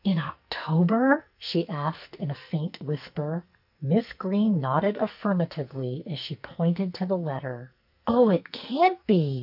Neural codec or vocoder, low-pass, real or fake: codec, 44.1 kHz, 2.6 kbps, SNAC; 5.4 kHz; fake